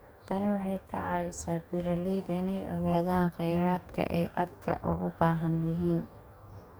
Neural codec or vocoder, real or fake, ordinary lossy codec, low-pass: codec, 44.1 kHz, 2.6 kbps, DAC; fake; none; none